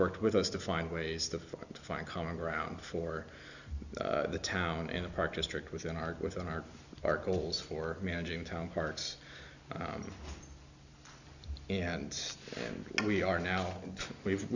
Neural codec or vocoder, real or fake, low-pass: none; real; 7.2 kHz